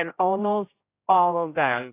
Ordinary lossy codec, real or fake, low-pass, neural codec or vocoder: AAC, 24 kbps; fake; 3.6 kHz; codec, 16 kHz, 0.5 kbps, X-Codec, HuBERT features, trained on general audio